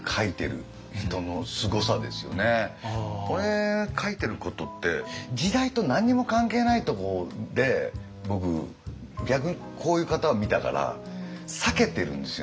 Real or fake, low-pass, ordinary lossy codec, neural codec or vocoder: real; none; none; none